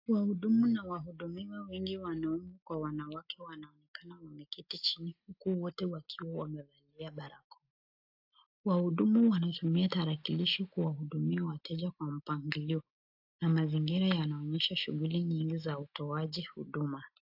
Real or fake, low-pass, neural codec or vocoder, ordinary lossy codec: real; 5.4 kHz; none; AAC, 48 kbps